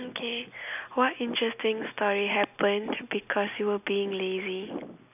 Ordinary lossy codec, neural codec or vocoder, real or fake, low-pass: none; none; real; 3.6 kHz